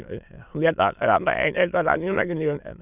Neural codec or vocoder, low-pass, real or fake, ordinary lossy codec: autoencoder, 22.05 kHz, a latent of 192 numbers a frame, VITS, trained on many speakers; 3.6 kHz; fake; none